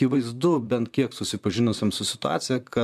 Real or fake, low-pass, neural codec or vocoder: fake; 14.4 kHz; vocoder, 44.1 kHz, 128 mel bands, Pupu-Vocoder